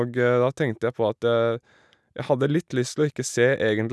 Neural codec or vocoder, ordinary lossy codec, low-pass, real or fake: none; none; none; real